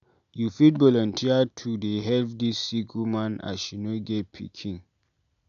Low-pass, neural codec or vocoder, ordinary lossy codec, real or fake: 7.2 kHz; none; AAC, 96 kbps; real